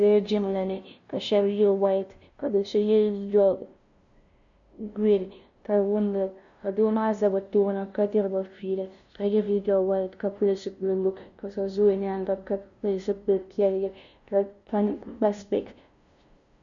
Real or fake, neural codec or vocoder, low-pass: fake; codec, 16 kHz, 0.5 kbps, FunCodec, trained on LibriTTS, 25 frames a second; 7.2 kHz